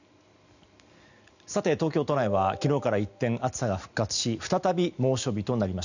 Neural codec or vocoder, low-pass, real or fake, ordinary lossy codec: none; 7.2 kHz; real; none